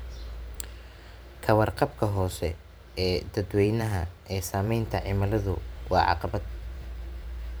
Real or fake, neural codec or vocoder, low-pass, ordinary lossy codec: fake; vocoder, 44.1 kHz, 128 mel bands every 512 samples, BigVGAN v2; none; none